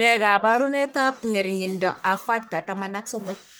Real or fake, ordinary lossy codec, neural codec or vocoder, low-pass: fake; none; codec, 44.1 kHz, 1.7 kbps, Pupu-Codec; none